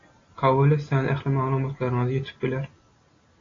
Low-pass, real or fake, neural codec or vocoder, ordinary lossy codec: 7.2 kHz; real; none; AAC, 32 kbps